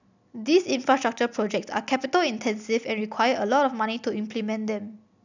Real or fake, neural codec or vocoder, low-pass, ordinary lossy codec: real; none; 7.2 kHz; none